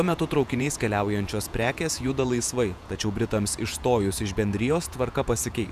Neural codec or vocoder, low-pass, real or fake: vocoder, 48 kHz, 128 mel bands, Vocos; 14.4 kHz; fake